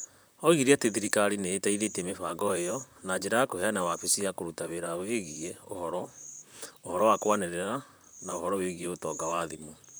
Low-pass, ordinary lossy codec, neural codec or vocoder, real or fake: none; none; vocoder, 44.1 kHz, 128 mel bands, Pupu-Vocoder; fake